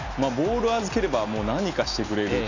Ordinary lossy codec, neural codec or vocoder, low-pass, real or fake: none; none; 7.2 kHz; real